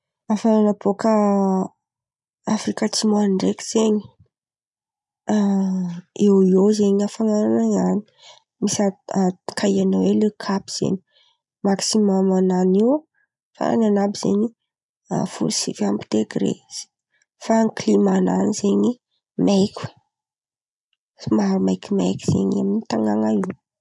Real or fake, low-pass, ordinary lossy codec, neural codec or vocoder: real; 10.8 kHz; none; none